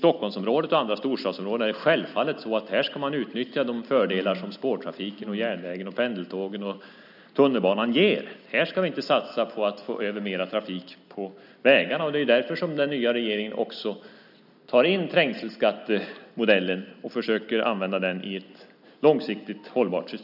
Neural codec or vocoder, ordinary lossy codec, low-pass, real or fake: none; none; 5.4 kHz; real